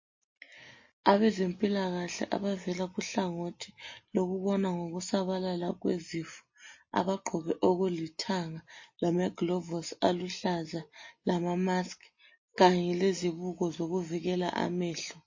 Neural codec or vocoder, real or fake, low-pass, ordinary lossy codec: none; real; 7.2 kHz; MP3, 32 kbps